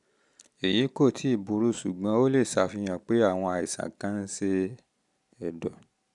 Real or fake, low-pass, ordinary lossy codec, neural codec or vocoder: real; 10.8 kHz; none; none